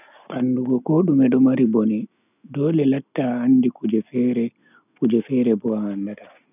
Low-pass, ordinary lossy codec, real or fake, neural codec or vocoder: 3.6 kHz; none; real; none